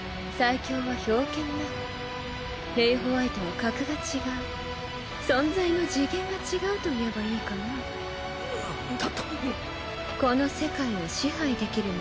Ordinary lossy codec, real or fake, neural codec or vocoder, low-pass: none; real; none; none